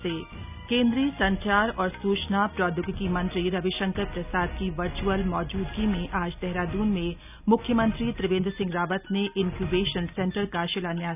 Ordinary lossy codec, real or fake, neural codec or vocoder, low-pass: none; real; none; 3.6 kHz